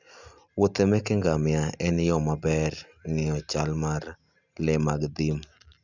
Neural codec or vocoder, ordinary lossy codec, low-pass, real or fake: none; none; 7.2 kHz; real